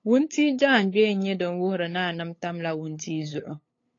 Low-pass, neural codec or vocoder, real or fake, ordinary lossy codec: 7.2 kHz; codec, 16 kHz, 8 kbps, FunCodec, trained on LibriTTS, 25 frames a second; fake; AAC, 32 kbps